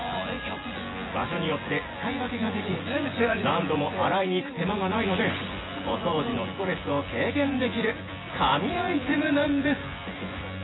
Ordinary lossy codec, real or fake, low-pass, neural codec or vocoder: AAC, 16 kbps; fake; 7.2 kHz; vocoder, 24 kHz, 100 mel bands, Vocos